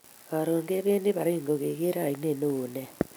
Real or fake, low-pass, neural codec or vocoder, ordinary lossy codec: real; none; none; none